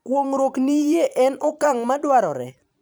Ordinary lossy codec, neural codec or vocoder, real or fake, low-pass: none; vocoder, 44.1 kHz, 128 mel bands every 512 samples, BigVGAN v2; fake; none